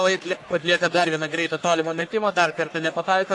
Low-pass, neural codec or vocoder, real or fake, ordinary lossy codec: 10.8 kHz; codec, 44.1 kHz, 1.7 kbps, Pupu-Codec; fake; AAC, 48 kbps